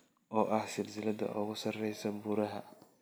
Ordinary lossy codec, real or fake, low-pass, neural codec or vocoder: none; real; none; none